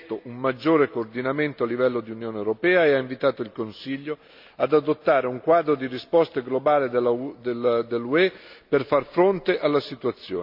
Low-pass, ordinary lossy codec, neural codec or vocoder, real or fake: 5.4 kHz; MP3, 48 kbps; none; real